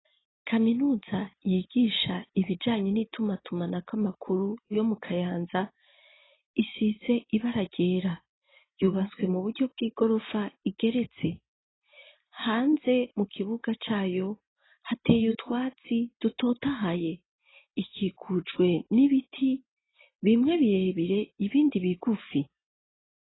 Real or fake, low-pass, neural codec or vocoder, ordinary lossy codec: real; 7.2 kHz; none; AAC, 16 kbps